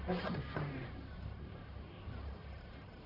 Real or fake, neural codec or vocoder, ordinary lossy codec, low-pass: fake; codec, 44.1 kHz, 1.7 kbps, Pupu-Codec; none; 5.4 kHz